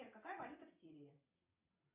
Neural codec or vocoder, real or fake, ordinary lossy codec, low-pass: vocoder, 22.05 kHz, 80 mel bands, WaveNeXt; fake; MP3, 32 kbps; 3.6 kHz